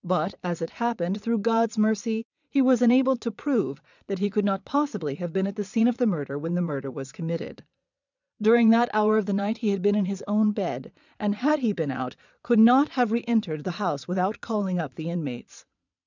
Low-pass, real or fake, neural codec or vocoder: 7.2 kHz; fake; vocoder, 22.05 kHz, 80 mel bands, Vocos